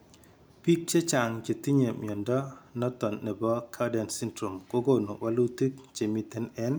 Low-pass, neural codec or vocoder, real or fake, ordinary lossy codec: none; none; real; none